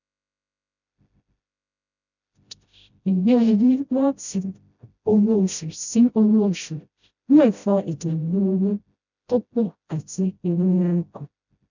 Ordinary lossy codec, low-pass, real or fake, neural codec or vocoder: none; 7.2 kHz; fake; codec, 16 kHz, 0.5 kbps, FreqCodec, smaller model